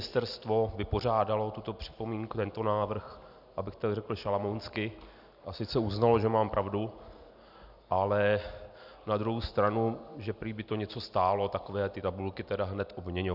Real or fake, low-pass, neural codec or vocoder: real; 5.4 kHz; none